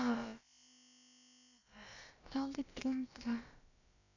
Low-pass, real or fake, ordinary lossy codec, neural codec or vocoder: 7.2 kHz; fake; none; codec, 16 kHz, about 1 kbps, DyCAST, with the encoder's durations